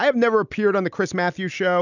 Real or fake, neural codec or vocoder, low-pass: real; none; 7.2 kHz